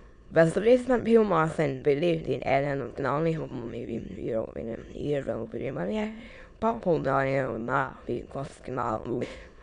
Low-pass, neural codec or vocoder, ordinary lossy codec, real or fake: 9.9 kHz; autoencoder, 22.05 kHz, a latent of 192 numbers a frame, VITS, trained on many speakers; none; fake